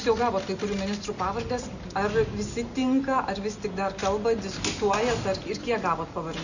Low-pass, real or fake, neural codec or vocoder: 7.2 kHz; real; none